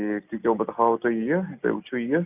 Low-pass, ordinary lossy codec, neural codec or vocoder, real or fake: 3.6 kHz; none; none; real